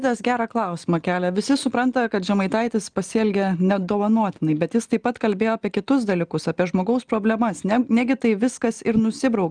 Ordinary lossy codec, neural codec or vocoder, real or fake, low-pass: Opus, 32 kbps; none; real; 9.9 kHz